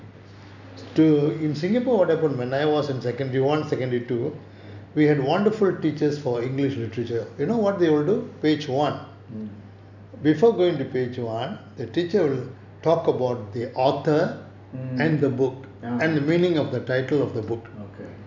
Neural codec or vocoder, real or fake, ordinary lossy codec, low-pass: none; real; none; 7.2 kHz